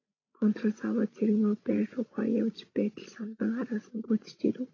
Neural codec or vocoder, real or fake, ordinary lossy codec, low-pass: codec, 16 kHz, 16 kbps, FreqCodec, larger model; fake; AAC, 32 kbps; 7.2 kHz